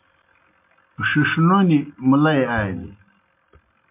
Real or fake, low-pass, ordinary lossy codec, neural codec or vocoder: real; 3.6 kHz; MP3, 32 kbps; none